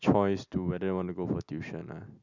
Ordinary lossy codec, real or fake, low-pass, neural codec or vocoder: none; real; 7.2 kHz; none